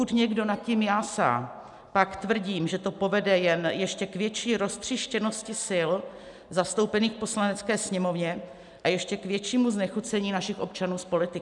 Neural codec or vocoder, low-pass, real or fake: none; 10.8 kHz; real